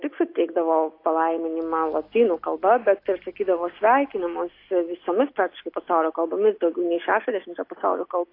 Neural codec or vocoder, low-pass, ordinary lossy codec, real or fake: none; 5.4 kHz; AAC, 32 kbps; real